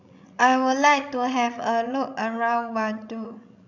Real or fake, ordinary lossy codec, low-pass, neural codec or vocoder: fake; none; 7.2 kHz; codec, 16 kHz, 16 kbps, FreqCodec, larger model